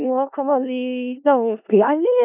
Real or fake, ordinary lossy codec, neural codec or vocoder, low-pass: fake; none; codec, 16 kHz in and 24 kHz out, 0.4 kbps, LongCat-Audio-Codec, four codebook decoder; 3.6 kHz